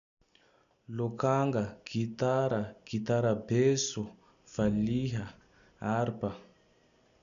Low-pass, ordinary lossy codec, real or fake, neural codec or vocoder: 7.2 kHz; none; real; none